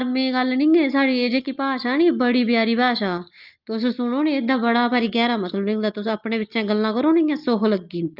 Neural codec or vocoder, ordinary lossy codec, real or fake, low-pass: none; Opus, 24 kbps; real; 5.4 kHz